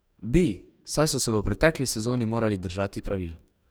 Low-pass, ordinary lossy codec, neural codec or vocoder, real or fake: none; none; codec, 44.1 kHz, 2.6 kbps, DAC; fake